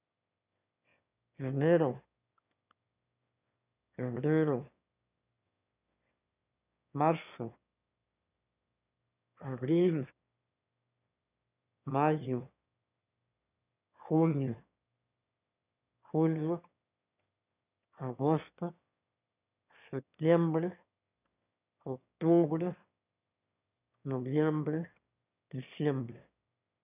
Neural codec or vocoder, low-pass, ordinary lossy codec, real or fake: autoencoder, 22.05 kHz, a latent of 192 numbers a frame, VITS, trained on one speaker; 3.6 kHz; none; fake